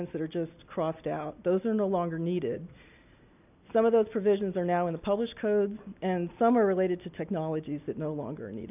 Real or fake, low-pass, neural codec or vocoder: real; 3.6 kHz; none